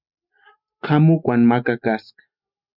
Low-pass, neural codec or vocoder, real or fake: 5.4 kHz; none; real